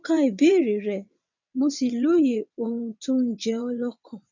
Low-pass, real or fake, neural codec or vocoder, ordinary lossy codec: 7.2 kHz; fake; vocoder, 22.05 kHz, 80 mel bands, WaveNeXt; none